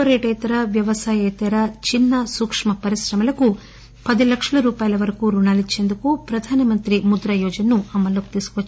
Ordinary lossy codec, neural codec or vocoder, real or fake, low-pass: none; none; real; none